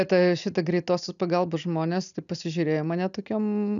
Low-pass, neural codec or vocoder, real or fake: 7.2 kHz; none; real